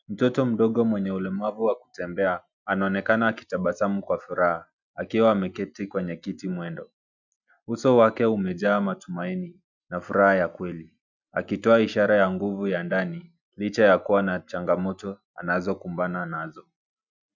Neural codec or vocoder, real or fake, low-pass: none; real; 7.2 kHz